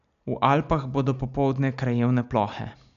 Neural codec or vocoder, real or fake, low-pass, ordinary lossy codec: none; real; 7.2 kHz; none